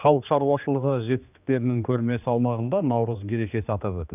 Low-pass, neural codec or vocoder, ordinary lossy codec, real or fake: 3.6 kHz; codec, 16 kHz, 2 kbps, X-Codec, HuBERT features, trained on general audio; none; fake